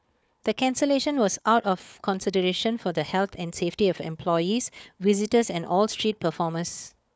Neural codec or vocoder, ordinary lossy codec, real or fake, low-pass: codec, 16 kHz, 4 kbps, FunCodec, trained on Chinese and English, 50 frames a second; none; fake; none